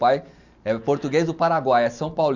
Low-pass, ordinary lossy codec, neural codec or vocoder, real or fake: 7.2 kHz; none; none; real